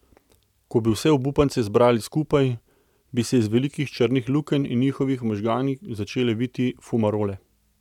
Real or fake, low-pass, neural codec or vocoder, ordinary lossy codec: real; 19.8 kHz; none; none